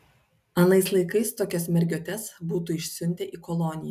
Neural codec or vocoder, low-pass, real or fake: none; 14.4 kHz; real